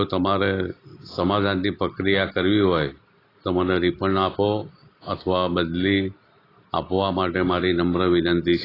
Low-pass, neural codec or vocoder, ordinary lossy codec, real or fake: 5.4 kHz; none; AAC, 24 kbps; real